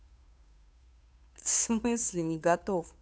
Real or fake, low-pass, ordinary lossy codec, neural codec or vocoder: fake; none; none; codec, 16 kHz, 2 kbps, FunCodec, trained on Chinese and English, 25 frames a second